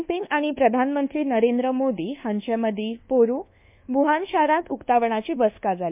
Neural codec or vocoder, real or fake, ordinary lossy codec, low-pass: codec, 24 kHz, 1.2 kbps, DualCodec; fake; MP3, 32 kbps; 3.6 kHz